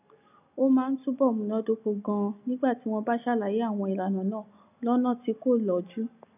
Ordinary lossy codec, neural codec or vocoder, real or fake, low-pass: none; none; real; 3.6 kHz